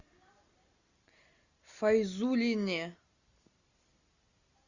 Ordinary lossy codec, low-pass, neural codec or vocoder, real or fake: Opus, 64 kbps; 7.2 kHz; none; real